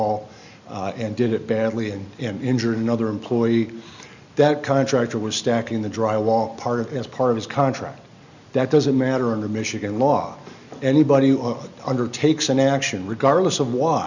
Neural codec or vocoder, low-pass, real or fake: none; 7.2 kHz; real